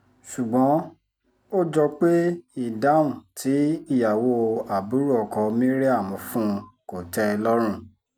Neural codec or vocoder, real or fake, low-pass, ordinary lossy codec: none; real; none; none